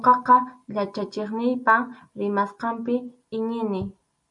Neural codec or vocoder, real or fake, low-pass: none; real; 10.8 kHz